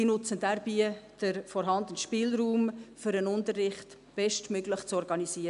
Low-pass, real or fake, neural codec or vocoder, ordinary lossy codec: 10.8 kHz; real; none; none